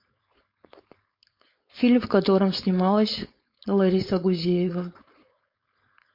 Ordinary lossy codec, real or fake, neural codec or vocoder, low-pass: MP3, 32 kbps; fake; codec, 16 kHz, 4.8 kbps, FACodec; 5.4 kHz